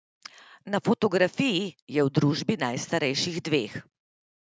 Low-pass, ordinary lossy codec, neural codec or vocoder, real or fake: none; none; none; real